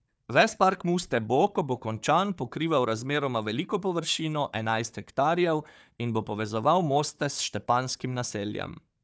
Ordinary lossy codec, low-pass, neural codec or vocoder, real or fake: none; none; codec, 16 kHz, 4 kbps, FunCodec, trained on Chinese and English, 50 frames a second; fake